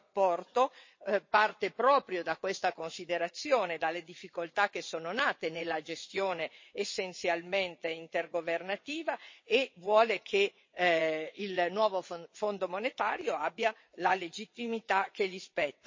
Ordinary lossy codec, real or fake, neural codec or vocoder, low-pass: MP3, 32 kbps; fake; vocoder, 22.05 kHz, 80 mel bands, WaveNeXt; 7.2 kHz